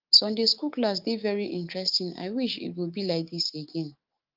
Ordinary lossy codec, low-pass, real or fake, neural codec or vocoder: Opus, 32 kbps; 5.4 kHz; fake; autoencoder, 48 kHz, 128 numbers a frame, DAC-VAE, trained on Japanese speech